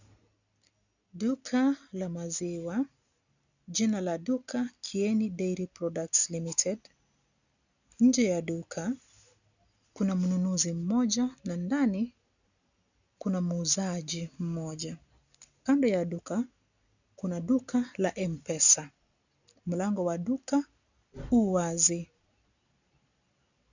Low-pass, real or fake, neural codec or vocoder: 7.2 kHz; real; none